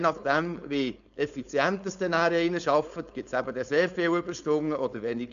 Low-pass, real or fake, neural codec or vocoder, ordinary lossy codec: 7.2 kHz; fake; codec, 16 kHz, 4.8 kbps, FACodec; none